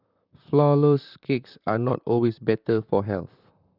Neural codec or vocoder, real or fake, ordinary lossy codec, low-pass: vocoder, 44.1 kHz, 128 mel bands, Pupu-Vocoder; fake; none; 5.4 kHz